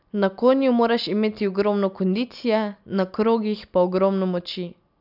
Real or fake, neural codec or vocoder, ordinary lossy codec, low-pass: real; none; none; 5.4 kHz